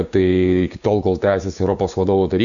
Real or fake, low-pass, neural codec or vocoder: fake; 7.2 kHz; codec, 16 kHz, 6 kbps, DAC